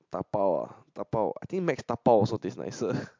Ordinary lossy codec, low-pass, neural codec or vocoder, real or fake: MP3, 64 kbps; 7.2 kHz; none; real